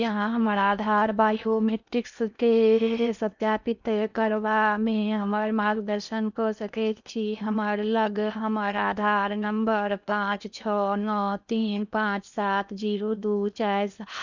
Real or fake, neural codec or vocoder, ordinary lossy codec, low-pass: fake; codec, 16 kHz in and 24 kHz out, 0.8 kbps, FocalCodec, streaming, 65536 codes; none; 7.2 kHz